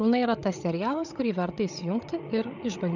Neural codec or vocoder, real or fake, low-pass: codec, 16 kHz, 8 kbps, FreqCodec, larger model; fake; 7.2 kHz